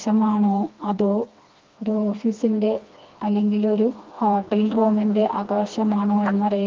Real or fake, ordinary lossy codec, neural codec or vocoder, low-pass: fake; Opus, 32 kbps; codec, 16 kHz, 2 kbps, FreqCodec, smaller model; 7.2 kHz